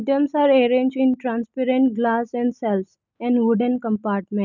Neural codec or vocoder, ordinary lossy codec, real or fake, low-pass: none; none; real; 7.2 kHz